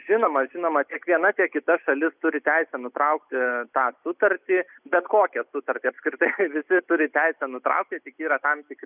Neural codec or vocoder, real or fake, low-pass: none; real; 3.6 kHz